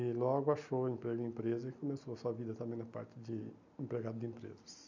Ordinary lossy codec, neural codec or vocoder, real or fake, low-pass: none; none; real; 7.2 kHz